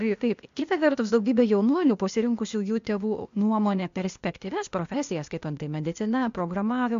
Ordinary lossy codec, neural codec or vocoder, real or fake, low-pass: MP3, 64 kbps; codec, 16 kHz, 0.8 kbps, ZipCodec; fake; 7.2 kHz